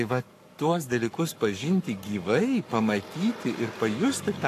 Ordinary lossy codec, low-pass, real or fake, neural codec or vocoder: AAC, 64 kbps; 14.4 kHz; fake; codec, 44.1 kHz, 7.8 kbps, Pupu-Codec